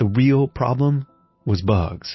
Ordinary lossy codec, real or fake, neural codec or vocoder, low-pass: MP3, 24 kbps; real; none; 7.2 kHz